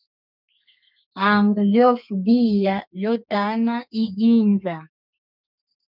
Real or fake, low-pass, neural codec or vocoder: fake; 5.4 kHz; codec, 32 kHz, 1.9 kbps, SNAC